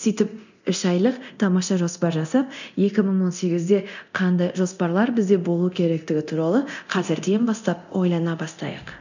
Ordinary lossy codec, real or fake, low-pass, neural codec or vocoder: none; fake; 7.2 kHz; codec, 24 kHz, 0.9 kbps, DualCodec